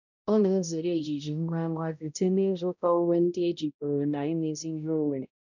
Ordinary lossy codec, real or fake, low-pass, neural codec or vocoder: none; fake; 7.2 kHz; codec, 16 kHz, 0.5 kbps, X-Codec, HuBERT features, trained on balanced general audio